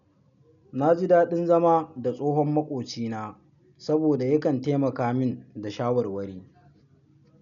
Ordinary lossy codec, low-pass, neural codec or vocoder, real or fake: none; 7.2 kHz; none; real